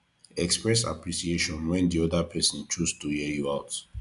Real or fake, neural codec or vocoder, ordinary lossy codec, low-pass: real; none; none; 10.8 kHz